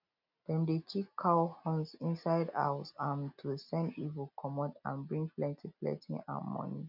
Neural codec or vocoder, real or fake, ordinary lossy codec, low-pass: none; real; none; 5.4 kHz